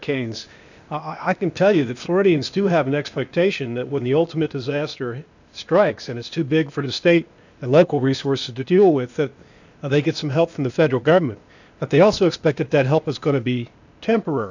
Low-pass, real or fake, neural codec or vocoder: 7.2 kHz; fake; codec, 16 kHz, 0.8 kbps, ZipCodec